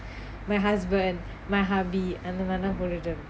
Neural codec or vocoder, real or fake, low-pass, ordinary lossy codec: none; real; none; none